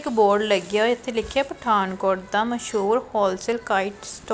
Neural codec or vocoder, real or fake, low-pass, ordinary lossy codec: none; real; none; none